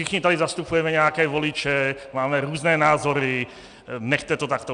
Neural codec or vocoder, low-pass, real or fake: none; 9.9 kHz; real